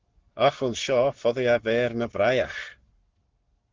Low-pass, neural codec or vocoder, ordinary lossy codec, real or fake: 7.2 kHz; codec, 44.1 kHz, 7.8 kbps, Pupu-Codec; Opus, 16 kbps; fake